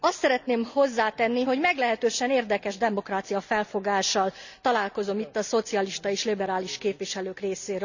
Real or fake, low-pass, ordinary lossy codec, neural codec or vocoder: real; 7.2 kHz; none; none